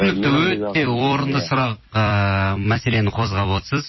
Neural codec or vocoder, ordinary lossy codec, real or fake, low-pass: vocoder, 44.1 kHz, 128 mel bands every 256 samples, BigVGAN v2; MP3, 24 kbps; fake; 7.2 kHz